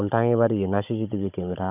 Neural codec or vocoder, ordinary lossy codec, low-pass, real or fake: codec, 16 kHz, 16 kbps, FunCodec, trained on Chinese and English, 50 frames a second; none; 3.6 kHz; fake